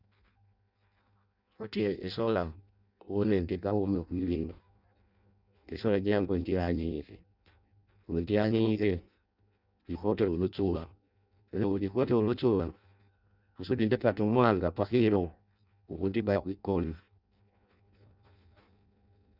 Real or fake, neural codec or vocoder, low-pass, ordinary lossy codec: fake; codec, 16 kHz in and 24 kHz out, 0.6 kbps, FireRedTTS-2 codec; 5.4 kHz; Opus, 64 kbps